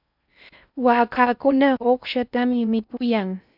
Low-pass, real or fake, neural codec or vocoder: 5.4 kHz; fake; codec, 16 kHz in and 24 kHz out, 0.6 kbps, FocalCodec, streaming, 4096 codes